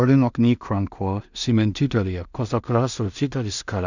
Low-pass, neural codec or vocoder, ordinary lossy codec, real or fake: 7.2 kHz; codec, 16 kHz in and 24 kHz out, 0.4 kbps, LongCat-Audio-Codec, two codebook decoder; none; fake